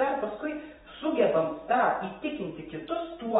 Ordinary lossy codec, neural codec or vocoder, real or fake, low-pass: AAC, 16 kbps; vocoder, 44.1 kHz, 128 mel bands every 512 samples, BigVGAN v2; fake; 19.8 kHz